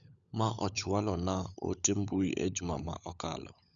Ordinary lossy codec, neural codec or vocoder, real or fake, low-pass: none; codec, 16 kHz, 16 kbps, FunCodec, trained on LibriTTS, 50 frames a second; fake; 7.2 kHz